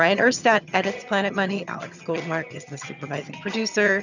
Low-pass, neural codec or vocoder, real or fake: 7.2 kHz; vocoder, 22.05 kHz, 80 mel bands, HiFi-GAN; fake